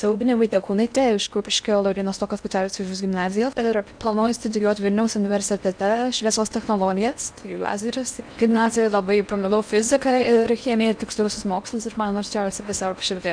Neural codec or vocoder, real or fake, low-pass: codec, 16 kHz in and 24 kHz out, 0.6 kbps, FocalCodec, streaming, 4096 codes; fake; 9.9 kHz